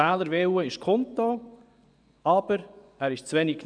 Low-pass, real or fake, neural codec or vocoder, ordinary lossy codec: 9.9 kHz; fake; vocoder, 24 kHz, 100 mel bands, Vocos; none